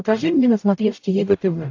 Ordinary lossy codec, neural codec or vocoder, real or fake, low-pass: AAC, 48 kbps; codec, 44.1 kHz, 0.9 kbps, DAC; fake; 7.2 kHz